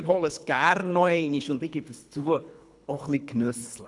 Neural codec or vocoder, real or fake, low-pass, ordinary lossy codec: codec, 24 kHz, 3 kbps, HILCodec; fake; 10.8 kHz; none